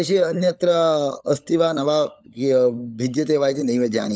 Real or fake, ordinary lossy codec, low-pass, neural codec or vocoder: fake; none; none; codec, 16 kHz, 16 kbps, FunCodec, trained on LibriTTS, 50 frames a second